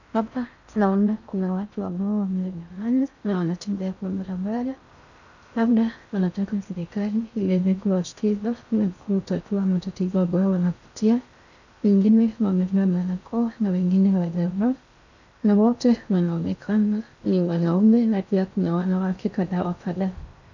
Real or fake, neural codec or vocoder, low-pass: fake; codec, 16 kHz in and 24 kHz out, 0.6 kbps, FocalCodec, streaming, 4096 codes; 7.2 kHz